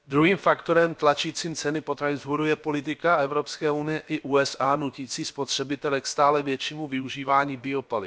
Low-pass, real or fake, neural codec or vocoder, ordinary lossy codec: none; fake; codec, 16 kHz, about 1 kbps, DyCAST, with the encoder's durations; none